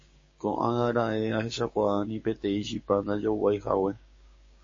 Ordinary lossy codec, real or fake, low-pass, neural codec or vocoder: MP3, 32 kbps; fake; 7.2 kHz; codec, 16 kHz, 6 kbps, DAC